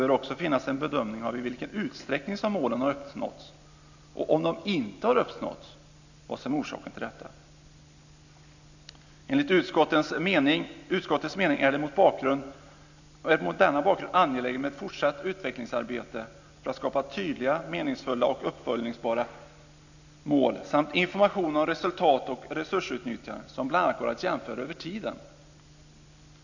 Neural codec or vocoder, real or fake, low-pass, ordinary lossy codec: none; real; 7.2 kHz; none